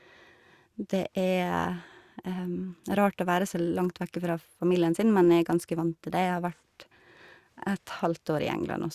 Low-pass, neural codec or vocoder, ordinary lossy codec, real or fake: 14.4 kHz; none; Opus, 64 kbps; real